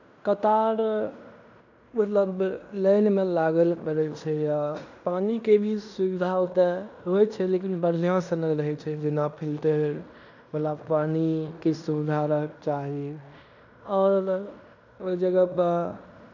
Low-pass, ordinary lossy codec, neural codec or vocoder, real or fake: 7.2 kHz; none; codec, 16 kHz in and 24 kHz out, 0.9 kbps, LongCat-Audio-Codec, fine tuned four codebook decoder; fake